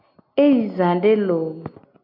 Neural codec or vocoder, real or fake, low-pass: none; real; 5.4 kHz